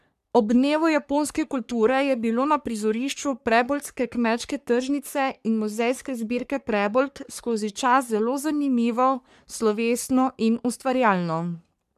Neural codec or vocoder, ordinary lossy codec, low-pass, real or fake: codec, 44.1 kHz, 3.4 kbps, Pupu-Codec; none; 14.4 kHz; fake